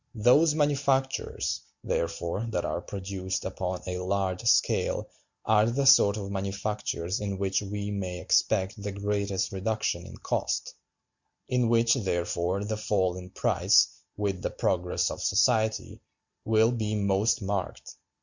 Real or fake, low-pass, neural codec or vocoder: real; 7.2 kHz; none